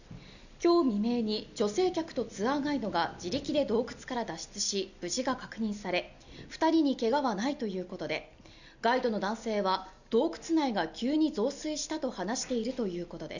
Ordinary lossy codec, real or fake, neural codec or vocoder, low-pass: none; real; none; 7.2 kHz